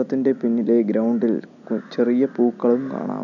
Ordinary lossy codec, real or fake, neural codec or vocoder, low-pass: none; real; none; 7.2 kHz